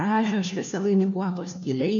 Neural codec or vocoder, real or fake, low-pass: codec, 16 kHz, 1 kbps, FunCodec, trained on LibriTTS, 50 frames a second; fake; 7.2 kHz